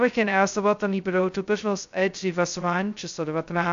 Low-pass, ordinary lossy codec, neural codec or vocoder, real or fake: 7.2 kHz; AAC, 64 kbps; codec, 16 kHz, 0.2 kbps, FocalCodec; fake